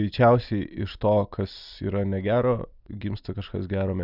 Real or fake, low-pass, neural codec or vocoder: real; 5.4 kHz; none